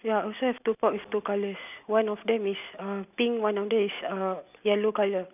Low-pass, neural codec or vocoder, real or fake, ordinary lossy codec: 3.6 kHz; none; real; none